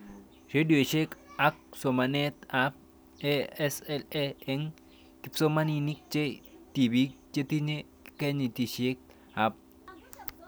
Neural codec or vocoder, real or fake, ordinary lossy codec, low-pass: none; real; none; none